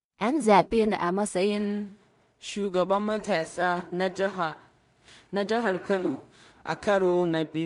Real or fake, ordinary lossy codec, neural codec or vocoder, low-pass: fake; MP3, 64 kbps; codec, 16 kHz in and 24 kHz out, 0.4 kbps, LongCat-Audio-Codec, two codebook decoder; 10.8 kHz